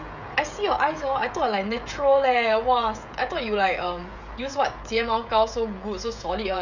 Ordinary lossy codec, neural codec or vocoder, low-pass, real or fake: none; codec, 16 kHz, 16 kbps, FreqCodec, smaller model; 7.2 kHz; fake